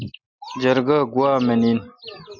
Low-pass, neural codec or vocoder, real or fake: 7.2 kHz; none; real